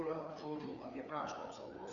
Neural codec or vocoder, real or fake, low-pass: codec, 16 kHz, 4 kbps, FreqCodec, larger model; fake; 7.2 kHz